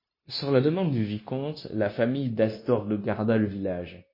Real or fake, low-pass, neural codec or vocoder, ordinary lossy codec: fake; 5.4 kHz; codec, 16 kHz, 0.9 kbps, LongCat-Audio-Codec; MP3, 24 kbps